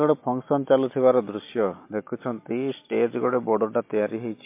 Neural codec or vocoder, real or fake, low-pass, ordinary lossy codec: none; real; 3.6 kHz; MP3, 24 kbps